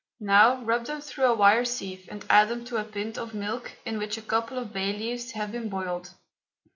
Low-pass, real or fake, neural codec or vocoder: 7.2 kHz; real; none